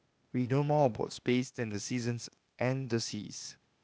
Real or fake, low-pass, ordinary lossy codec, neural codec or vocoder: fake; none; none; codec, 16 kHz, 0.8 kbps, ZipCodec